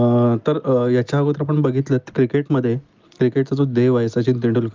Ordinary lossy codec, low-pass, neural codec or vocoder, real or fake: Opus, 24 kbps; 7.2 kHz; none; real